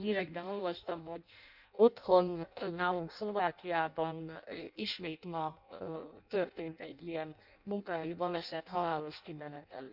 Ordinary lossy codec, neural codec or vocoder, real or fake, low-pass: none; codec, 16 kHz in and 24 kHz out, 0.6 kbps, FireRedTTS-2 codec; fake; 5.4 kHz